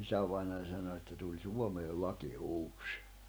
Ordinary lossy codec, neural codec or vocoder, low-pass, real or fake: none; none; none; real